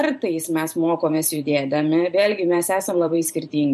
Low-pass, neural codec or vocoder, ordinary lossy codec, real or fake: 14.4 kHz; vocoder, 44.1 kHz, 128 mel bands every 512 samples, BigVGAN v2; MP3, 64 kbps; fake